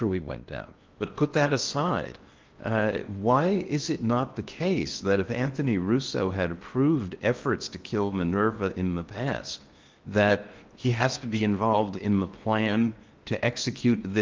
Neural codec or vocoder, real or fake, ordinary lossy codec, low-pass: codec, 16 kHz in and 24 kHz out, 0.8 kbps, FocalCodec, streaming, 65536 codes; fake; Opus, 24 kbps; 7.2 kHz